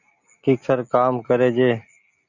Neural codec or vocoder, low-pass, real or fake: none; 7.2 kHz; real